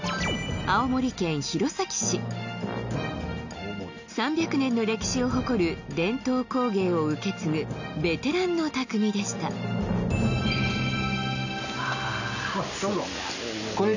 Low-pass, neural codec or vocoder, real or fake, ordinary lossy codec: 7.2 kHz; none; real; none